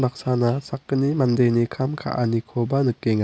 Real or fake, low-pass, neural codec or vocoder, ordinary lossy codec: real; none; none; none